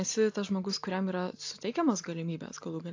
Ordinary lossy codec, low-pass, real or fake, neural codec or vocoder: AAC, 48 kbps; 7.2 kHz; real; none